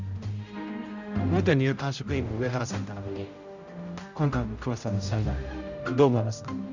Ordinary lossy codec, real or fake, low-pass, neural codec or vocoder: Opus, 64 kbps; fake; 7.2 kHz; codec, 16 kHz, 0.5 kbps, X-Codec, HuBERT features, trained on general audio